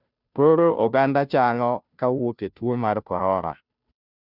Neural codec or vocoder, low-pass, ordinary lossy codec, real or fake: codec, 16 kHz, 0.5 kbps, FunCodec, trained on Chinese and English, 25 frames a second; 5.4 kHz; none; fake